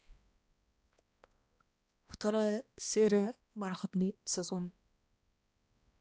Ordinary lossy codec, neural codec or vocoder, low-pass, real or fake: none; codec, 16 kHz, 1 kbps, X-Codec, HuBERT features, trained on balanced general audio; none; fake